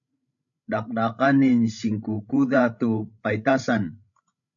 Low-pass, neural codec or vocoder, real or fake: 7.2 kHz; codec, 16 kHz, 16 kbps, FreqCodec, larger model; fake